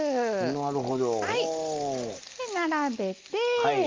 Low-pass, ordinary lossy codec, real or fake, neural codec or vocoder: 7.2 kHz; Opus, 24 kbps; real; none